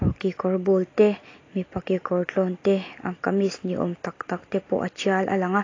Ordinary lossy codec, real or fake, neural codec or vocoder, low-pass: AAC, 32 kbps; real; none; 7.2 kHz